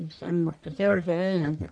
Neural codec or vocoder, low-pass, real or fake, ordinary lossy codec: codec, 44.1 kHz, 1.7 kbps, Pupu-Codec; 9.9 kHz; fake; none